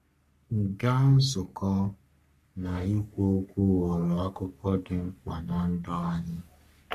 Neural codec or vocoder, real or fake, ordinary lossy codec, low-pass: codec, 44.1 kHz, 3.4 kbps, Pupu-Codec; fake; MP3, 64 kbps; 14.4 kHz